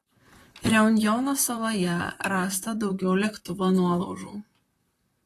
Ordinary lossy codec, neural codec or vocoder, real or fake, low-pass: AAC, 48 kbps; vocoder, 44.1 kHz, 128 mel bands, Pupu-Vocoder; fake; 14.4 kHz